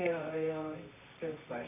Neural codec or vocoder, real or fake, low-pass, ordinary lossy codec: codec, 24 kHz, 0.9 kbps, WavTokenizer, medium music audio release; fake; 3.6 kHz; none